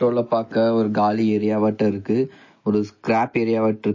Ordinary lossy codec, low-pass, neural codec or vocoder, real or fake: MP3, 32 kbps; 7.2 kHz; none; real